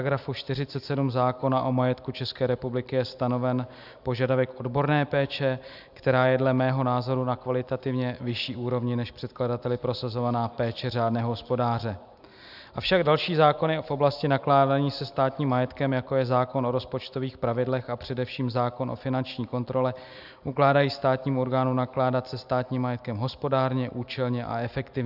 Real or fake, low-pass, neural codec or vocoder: real; 5.4 kHz; none